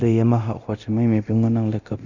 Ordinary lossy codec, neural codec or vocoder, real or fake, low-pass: none; codec, 24 kHz, 0.9 kbps, DualCodec; fake; 7.2 kHz